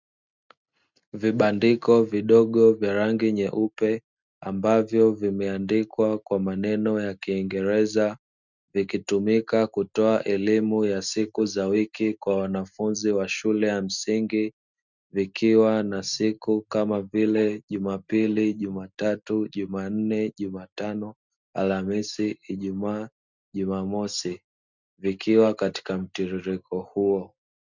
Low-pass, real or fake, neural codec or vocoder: 7.2 kHz; real; none